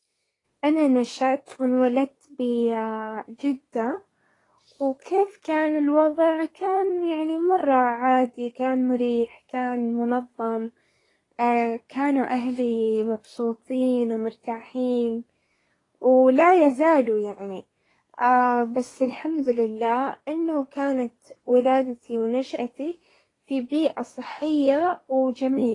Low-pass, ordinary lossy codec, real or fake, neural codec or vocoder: 10.8 kHz; AAC, 32 kbps; fake; codec, 24 kHz, 1 kbps, SNAC